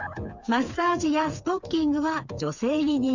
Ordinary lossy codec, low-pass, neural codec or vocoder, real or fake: none; 7.2 kHz; codec, 16 kHz, 4 kbps, FreqCodec, smaller model; fake